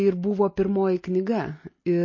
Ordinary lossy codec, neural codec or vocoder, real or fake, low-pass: MP3, 32 kbps; none; real; 7.2 kHz